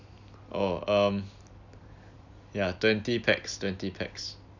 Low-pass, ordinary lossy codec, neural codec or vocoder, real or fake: 7.2 kHz; none; none; real